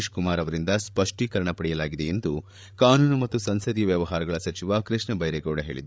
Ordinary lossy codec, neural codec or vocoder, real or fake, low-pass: none; codec, 16 kHz, 8 kbps, FreqCodec, larger model; fake; none